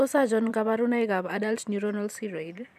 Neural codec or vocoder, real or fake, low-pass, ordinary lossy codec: vocoder, 44.1 kHz, 128 mel bands every 512 samples, BigVGAN v2; fake; 14.4 kHz; none